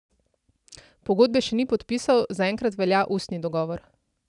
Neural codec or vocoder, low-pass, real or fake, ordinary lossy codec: none; 10.8 kHz; real; none